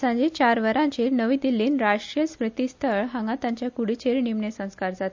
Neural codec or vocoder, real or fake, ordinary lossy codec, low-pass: none; real; MP3, 64 kbps; 7.2 kHz